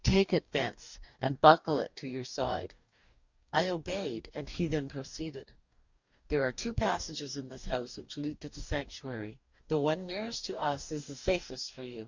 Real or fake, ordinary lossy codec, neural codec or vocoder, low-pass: fake; Opus, 64 kbps; codec, 44.1 kHz, 2.6 kbps, DAC; 7.2 kHz